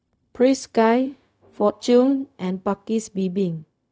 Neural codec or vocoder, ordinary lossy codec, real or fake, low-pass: codec, 16 kHz, 0.4 kbps, LongCat-Audio-Codec; none; fake; none